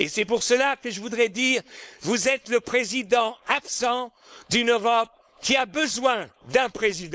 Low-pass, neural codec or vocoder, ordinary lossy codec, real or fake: none; codec, 16 kHz, 4.8 kbps, FACodec; none; fake